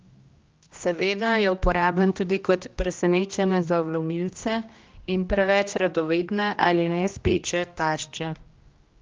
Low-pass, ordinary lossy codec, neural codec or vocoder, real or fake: 7.2 kHz; Opus, 24 kbps; codec, 16 kHz, 1 kbps, X-Codec, HuBERT features, trained on general audio; fake